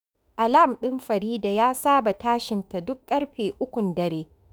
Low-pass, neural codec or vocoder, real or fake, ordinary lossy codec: none; autoencoder, 48 kHz, 32 numbers a frame, DAC-VAE, trained on Japanese speech; fake; none